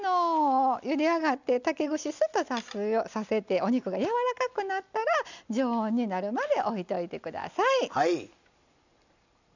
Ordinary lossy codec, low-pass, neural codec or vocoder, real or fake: none; 7.2 kHz; none; real